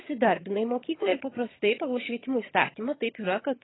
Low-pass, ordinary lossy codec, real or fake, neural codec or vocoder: 7.2 kHz; AAC, 16 kbps; fake; vocoder, 22.05 kHz, 80 mel bands, HiFi-GAN